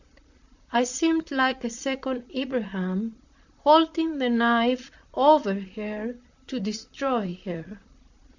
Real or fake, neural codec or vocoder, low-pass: fake; vocoder, 44.1 kHz, 128 mel bands, Pupu-Vocoder; 7.2 kHz